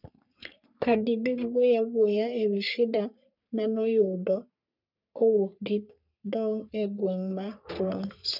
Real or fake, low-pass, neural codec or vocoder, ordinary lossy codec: fake; 5.4 kHz; codec, 44.1 kHz, 3.4 kbps, Pupu-Codec; none